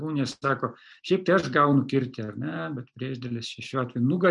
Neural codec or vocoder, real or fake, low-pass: none; real; 10.8 kHz